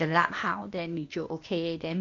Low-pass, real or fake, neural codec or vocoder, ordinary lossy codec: 7.2 kHz; fake; codec, 16 kHz, 0.8 kbps, ZipCodec; MP3, 48 kbps